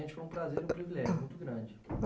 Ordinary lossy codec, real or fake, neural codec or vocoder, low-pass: none; real; none; none